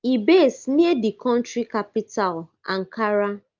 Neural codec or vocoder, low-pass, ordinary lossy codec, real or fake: none; 7.2 kHz; Opus, 24 kbps; real